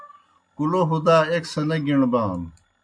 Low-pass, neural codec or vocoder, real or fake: 9.9 kHz; none; real